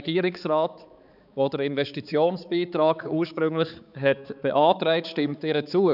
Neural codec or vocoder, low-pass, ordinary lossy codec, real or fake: codec, 16 kHz, 4 kbps, X-Codec, HuBERT features, trained on balanced general audio; 5.4 kHz; none; fake